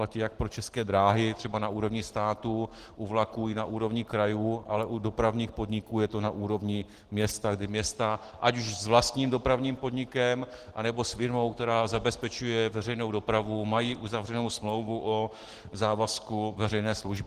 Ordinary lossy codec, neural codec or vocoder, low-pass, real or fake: Opus, 16 kbps; none; 14.4 kHz; real